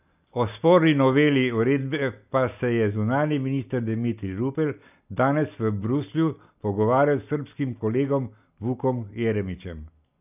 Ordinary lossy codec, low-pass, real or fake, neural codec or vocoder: none; 3.6 kHz; real; none